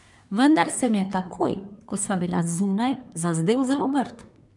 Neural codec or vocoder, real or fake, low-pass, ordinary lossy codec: codec, 24 kHz, 1 kbps, SNAC; fake; 10.8 kHz; none